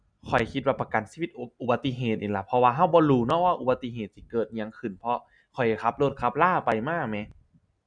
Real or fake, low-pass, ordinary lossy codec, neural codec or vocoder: real; 9.9 kHz; none; none